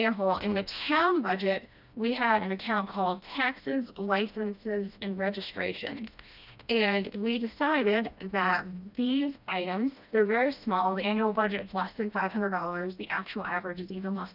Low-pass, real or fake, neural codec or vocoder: 5.4 kHz; fake; codec, 16 kHz, 1 kbps, FreqCodec, smaller model